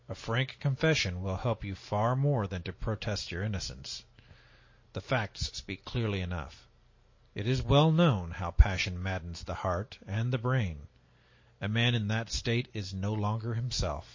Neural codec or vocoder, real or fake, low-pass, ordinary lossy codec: none; real; 7.2 kHz; MP3, 32 kbps